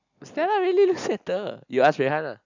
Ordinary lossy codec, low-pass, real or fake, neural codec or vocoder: none; 7.2 kHz; real; none